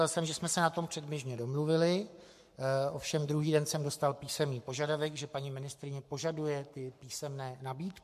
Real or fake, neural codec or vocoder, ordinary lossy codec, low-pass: fake; codec, 44.1 kHz, 7.8 kbps, Pupu-Codec; MP3, 64 kbps; 14.4 kHz